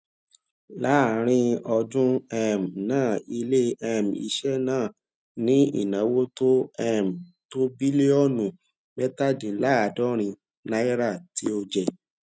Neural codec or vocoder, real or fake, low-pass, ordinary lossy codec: none; real; none; none